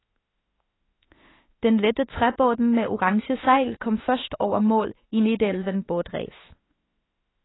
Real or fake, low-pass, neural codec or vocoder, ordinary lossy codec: fake; 7.2 kHz; autoencoder, 48 kHz, 32 numbers a frame, DAC-VAE, trained on Japanese speech; AAC, 16 kbps